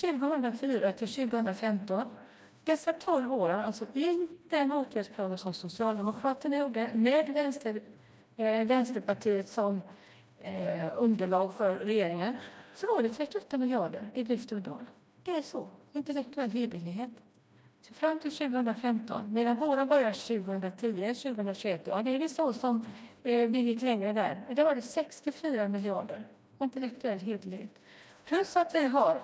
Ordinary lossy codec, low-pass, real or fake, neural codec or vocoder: none; none; fake; codec, 16 kHz, 1 kbps, FreqCodec, smaller model